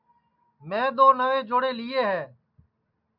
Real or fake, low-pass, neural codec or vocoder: real; 5.4 kHz; none